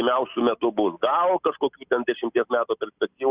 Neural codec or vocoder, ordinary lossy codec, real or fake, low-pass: none; Opus, 64 kbps; real; 3.6 kHz